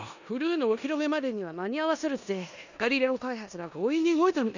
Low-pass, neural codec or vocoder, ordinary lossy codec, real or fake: 7.2 kHz; codec, 16 kHz in and 24 kHz out, 0.9 kbps, LongCat-Audio-Codec, four codebook decoder; none; fake